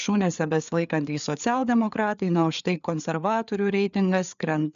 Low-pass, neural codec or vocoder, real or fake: 7.2 kHz; codec, 16 kHz, 4 kbps, FreqCodec, larger model; fake